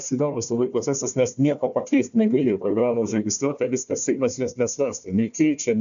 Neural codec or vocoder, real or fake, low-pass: codec, 16 kHz, 1 kbps, FunCodec, trained on Chinese and English, 50 frames a second; fake; 7.2 kHz